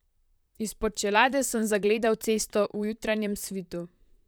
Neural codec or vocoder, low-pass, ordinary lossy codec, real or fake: vocoder, 44.1 kHz, 128 mel bands, Pupu-Vocoder; none; none; fake